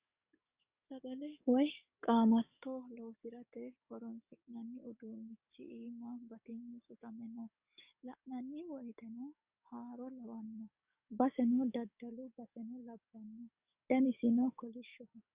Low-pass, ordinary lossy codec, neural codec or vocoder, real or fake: 3.6 kHz; Opus, 64 kbps; codec, 44.1 kHz, 7.8 kbps, DAC; fake